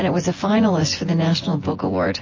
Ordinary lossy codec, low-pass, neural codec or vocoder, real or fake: MP3, 32 kbps; 7.2 kHz; vocoder, 24 kHz, 100 mel bands, Vocos; fake